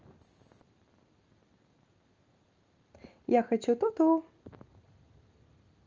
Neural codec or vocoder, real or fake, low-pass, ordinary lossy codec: none; real; 7.2 kHz; Opus, 24 kbps